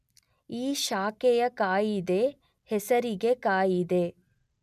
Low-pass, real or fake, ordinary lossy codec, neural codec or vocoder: 14.4 kHz; real; none; none